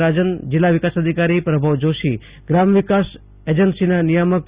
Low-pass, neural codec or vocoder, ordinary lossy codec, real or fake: 3.6 kHz; none; Opus, 64 kbps; real